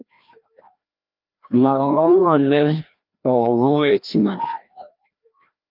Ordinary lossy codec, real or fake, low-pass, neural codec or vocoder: Opus, 24 kbps; fake; 5.4 kHz; codec, 16 kHz, 1 kbps, FreqCodec, larger model